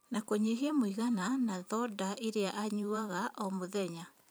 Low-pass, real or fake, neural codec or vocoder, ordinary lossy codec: none; fake; vocoder, 44.1 kHz, 128 mel bands every 512 samples, BigVGAN v2; none